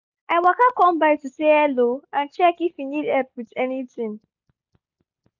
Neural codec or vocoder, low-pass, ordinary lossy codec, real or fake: none; 7.2 kHz; none; real